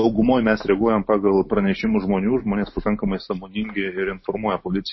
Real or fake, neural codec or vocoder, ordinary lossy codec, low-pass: real; none; MP3, 24 kbps; 7.2 kHz